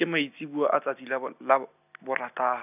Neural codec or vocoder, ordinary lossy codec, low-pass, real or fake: none; none; 3.6 kHz; real